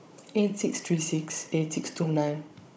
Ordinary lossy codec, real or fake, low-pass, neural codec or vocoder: none; fake; none; codec, 16 kHz, 16 kbps, FunCodec, trained on Chinese and English, 50 frames a second